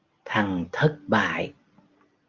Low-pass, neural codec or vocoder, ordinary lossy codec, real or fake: 7.2 kHz; none; Opus, 32 kbps; real